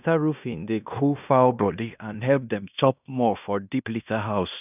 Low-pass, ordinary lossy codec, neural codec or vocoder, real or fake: 3.6 kHz; none; codec, 16 kHz in and 24 kHz out, 0.9 kbps, LongCat-Audio-Codec, fine tuned four codebook decoder; fake